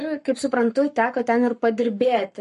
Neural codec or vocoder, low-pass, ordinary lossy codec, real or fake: codec, 44.1 kHz, 7.8 kbps, Pupu-Codec; 14.4 kHz; MP3, 48 kbps; fake